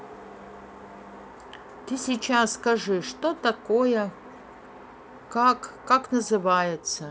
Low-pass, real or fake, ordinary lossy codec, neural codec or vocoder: none; real; none; none